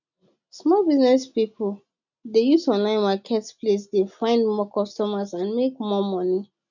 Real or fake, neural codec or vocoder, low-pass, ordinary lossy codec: real; none; 7.2 kHz; none